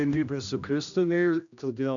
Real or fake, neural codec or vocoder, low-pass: fake; codec, 16 kHz, 1 kbps, X-Codec, HuBERT features, trained on general audio; 7.2 kHz